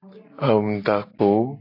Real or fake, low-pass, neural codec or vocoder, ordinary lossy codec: real; 5.4 kHz; none; AAC, 32 kbps